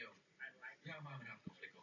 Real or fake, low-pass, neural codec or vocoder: real; 7.2 kHz; none